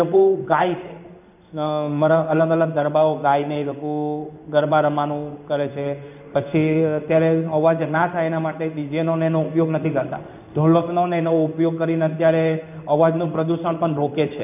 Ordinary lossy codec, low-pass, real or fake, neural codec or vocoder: none; 3.6 kHz; fake; codec, 16 kHz in and 24 kHz out, 1 kbps, XY-Tokenizer